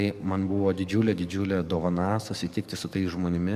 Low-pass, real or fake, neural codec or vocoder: 14.4 kHz; fake; codec, 44.1 kHz, 7.8 kbps, DAC